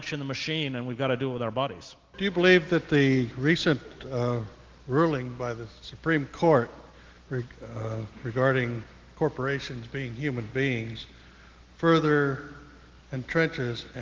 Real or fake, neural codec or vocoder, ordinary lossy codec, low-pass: real; none; Opus, 16 kbps; 7.2 kHz